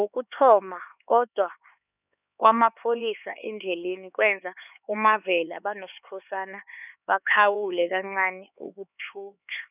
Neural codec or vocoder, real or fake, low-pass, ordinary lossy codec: codec, 16 kHz, 4 kbps, X-Codec, HuBERT features, trained on LibriSpeech; fake; 3.6 kHz; none